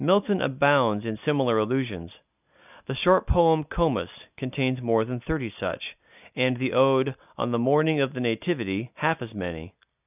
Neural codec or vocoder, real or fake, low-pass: none; real; 3.6 kHz